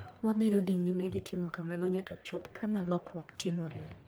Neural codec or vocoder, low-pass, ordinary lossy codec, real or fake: codec, 44.1 kHz, 1.7 kbps, Pupu-Codec; none; none; fake